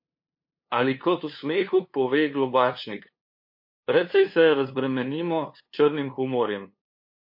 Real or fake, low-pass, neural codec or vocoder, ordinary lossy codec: fake; 5.4 kHz; codec, 16 kHz, 2 kbps, FunCodec, trained on LibriTTS, 25 frames a second; MP3, 32 kbps